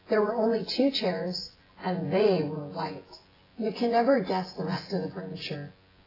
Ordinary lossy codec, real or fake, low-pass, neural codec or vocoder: AAC, 24 kbps; fake; 5.4 kHz; vocoder, 24 kHz, 100 mel bands, Vocos